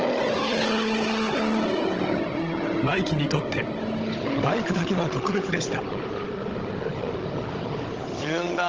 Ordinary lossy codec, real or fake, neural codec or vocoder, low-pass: Opus, 16 kbps; fake; codec, 16 kHz, 16 kbps, FunCodec, trained on Chinese and English, 50 frames a second; 7.2 kHz